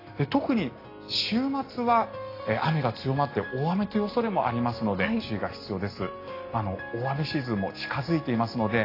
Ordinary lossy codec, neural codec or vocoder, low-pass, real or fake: AAC, 24 kbps; none; 5.4 kHz; real